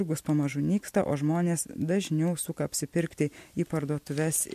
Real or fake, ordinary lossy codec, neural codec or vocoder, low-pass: fake; MP3, 64 kbps; vocoder, 44.1 kHz, 128 mel bands every 256 samples, BigVGAN v2; 14.4 kHz